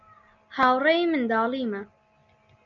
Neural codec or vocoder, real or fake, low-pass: none; real; 7.2 kHz